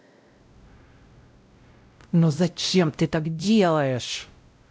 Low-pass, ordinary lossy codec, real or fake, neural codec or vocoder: none; none; fake; codec, 16 kHz, 0.5 kbps, X-Codec, WavLM features, trained on Multilingual LibriSpeech